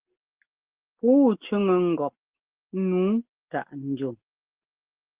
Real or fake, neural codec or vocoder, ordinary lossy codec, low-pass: real; none; Opus, 16 kbps; 3.6 kHz